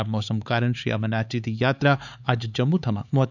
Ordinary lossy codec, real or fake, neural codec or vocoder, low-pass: none; fake; codec, 16 kHz, 4 kbps, X-Codec, HuBERT features, trained on LibriSpeech; 7.2 kHz